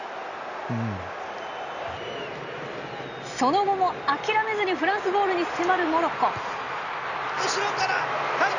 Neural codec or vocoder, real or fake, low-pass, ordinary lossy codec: none; real; 7.2 kHz; none